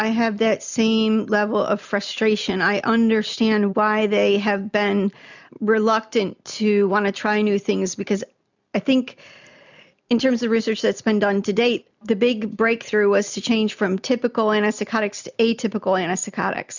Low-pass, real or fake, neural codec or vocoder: 7.2 kHz; real; none